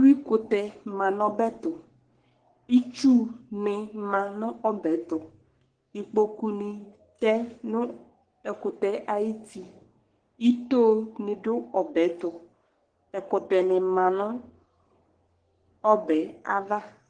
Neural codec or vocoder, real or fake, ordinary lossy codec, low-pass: codec, 44.1 kHz, 3.4 kbps, Pupu-Codec; fake; Opus, 16 kbps; 9.9 kHz